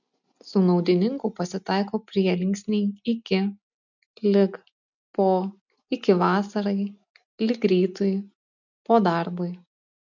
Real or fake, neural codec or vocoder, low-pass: real; none; 7.2 kHz